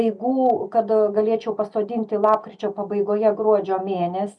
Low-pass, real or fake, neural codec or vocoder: 10.8 kHz; real; none